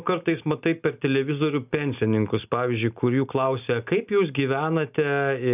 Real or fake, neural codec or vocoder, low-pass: real; none; 3.6 kHz